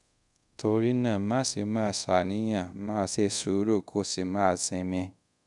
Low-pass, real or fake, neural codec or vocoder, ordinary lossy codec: 10.8 kHz; fake; codec, 24 kHz, 0.5 kbps, DualCodec; none